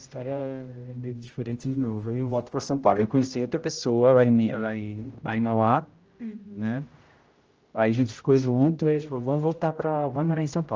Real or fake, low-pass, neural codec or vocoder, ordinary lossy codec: fake; 7.2 kHz; codec, 16 kHz, 0.5 kbps, X-Codec, HuBERT features, trained on general audio; Opus, 24 kbps